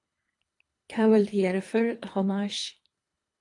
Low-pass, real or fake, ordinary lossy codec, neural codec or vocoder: 10.8 kHz; fake; AAC, 48 kbps; codec, 24 kHz, 3 kbps, HILCodec